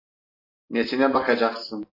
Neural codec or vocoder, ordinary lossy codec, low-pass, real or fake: none; AAC, 24 kbps; 5.4 kHz; real